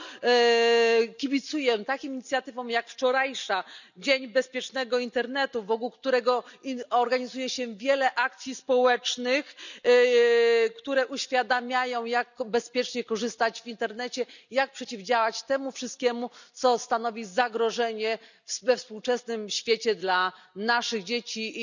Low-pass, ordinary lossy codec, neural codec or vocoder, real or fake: 7.2 kHz; none; none; real